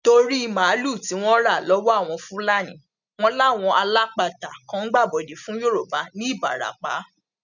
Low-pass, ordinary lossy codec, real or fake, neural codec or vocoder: 7.2 kHz; none; real; none